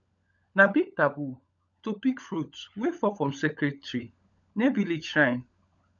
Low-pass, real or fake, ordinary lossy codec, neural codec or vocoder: 7.2 kHz; fake; none; codec, 16 kHz, 16 kbps, FunCodec, trained on LibriTTS, 50 frames a second